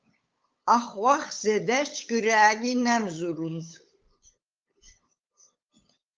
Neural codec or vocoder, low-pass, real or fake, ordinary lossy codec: codec, 16 kHz, 8 kbps, FunCodec, trained on LibriTTS, 25 frames a second; 7.2 kHz; fake; Opus, 24 kbps